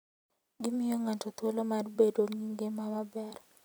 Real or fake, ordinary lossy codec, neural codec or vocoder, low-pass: fake; none; vocoder, 44.1 kHz, 128 mel bands every 256 samples, BigVGAN v2; none